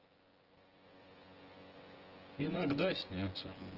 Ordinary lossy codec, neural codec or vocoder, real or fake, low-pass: Opus, 16 kbps; vocoder, 24 kHz, 100 mel bands, Vocos; fake; 5.4 kHz